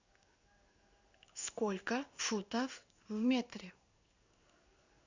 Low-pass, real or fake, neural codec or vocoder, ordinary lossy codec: 7.2 kHz; fake; codec, 16 kHz in and 24 kHz out, 1 kbps, XY-Tokenizer; Opus, 64 kbps